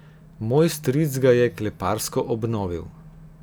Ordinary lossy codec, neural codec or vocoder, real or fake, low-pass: none; none; real; none